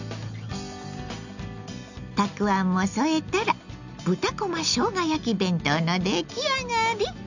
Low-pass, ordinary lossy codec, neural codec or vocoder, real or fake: 7.2 kHz; none; none; real